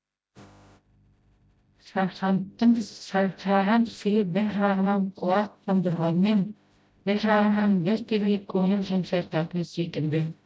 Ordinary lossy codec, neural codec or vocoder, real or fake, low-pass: none; codec, 16 kHz, 0.5 kbps, FreqCodec, smaller model; fake; none